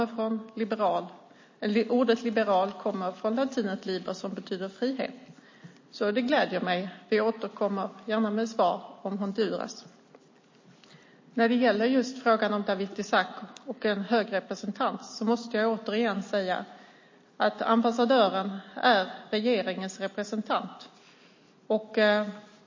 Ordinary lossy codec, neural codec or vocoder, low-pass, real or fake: MP3, 32 kbps; none; 7.2 kHz; real